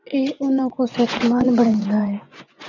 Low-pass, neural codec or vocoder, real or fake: 7.2 kHz; vocoder, 24 kHz, 100 mel bands, Vocos; fake